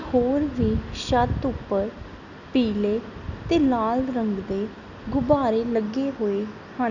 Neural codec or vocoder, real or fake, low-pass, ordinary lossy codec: none; real; 7.2 kHz; none